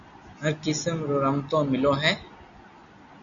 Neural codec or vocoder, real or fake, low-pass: none; real; 7.2 kHz